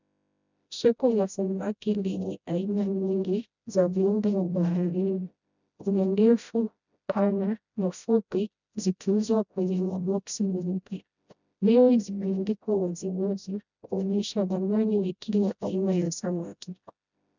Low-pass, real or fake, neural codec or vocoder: 7.2 kHz; fake; codec, 16 kHz, 0.5 kbps, FreqCodec, smaller model